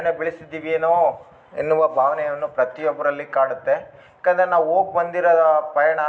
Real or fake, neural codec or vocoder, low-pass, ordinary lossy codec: real; none; none; none